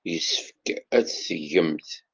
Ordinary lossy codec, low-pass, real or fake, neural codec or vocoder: Opus, 32 kbps; 7.2 kHz; real; none